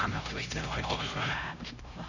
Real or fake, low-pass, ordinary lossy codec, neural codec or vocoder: fake; 7.2 kHz; none; codec, 16 kHz, 0.5 kbps, X-Codec, HuBERT features, trained on LibriSpeech